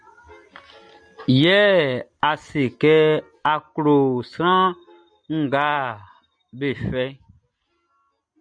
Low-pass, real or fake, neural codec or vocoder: 9.9 kHz; real; none